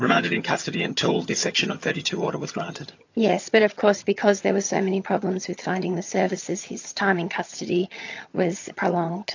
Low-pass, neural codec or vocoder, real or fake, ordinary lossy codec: 7.2 kHz; vocoder, 22.05 kHz, 80 mel bands, HiFi-GAN; fake; AAC, 48 kbps